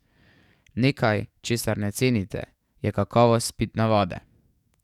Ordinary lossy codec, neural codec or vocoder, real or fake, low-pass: none; codec, 44.1 kHz, 7.8 kbps, DAC; fake; 19.8 kHz